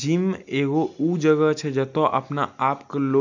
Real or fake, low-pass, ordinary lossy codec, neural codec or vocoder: real; 7.2 kHz; none; none